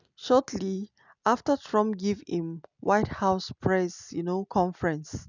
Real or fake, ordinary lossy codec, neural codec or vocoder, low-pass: real; none; none; 7.2 kHz